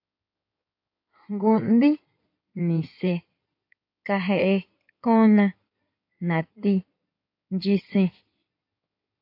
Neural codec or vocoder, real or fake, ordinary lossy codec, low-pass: codec, 16 kHz in and 24 kHz out, 2.2 kbps, FireRedTTS-2 codec; fake; AAC, 48 kbps; 5.4 kHz